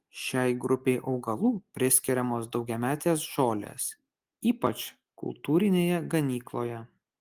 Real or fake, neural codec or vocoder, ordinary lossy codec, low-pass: real; none; Opus, 24 kbps; 14.4 kHz